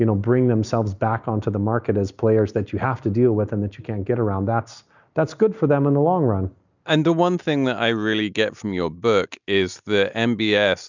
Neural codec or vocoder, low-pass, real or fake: none; 7.2 kHz; real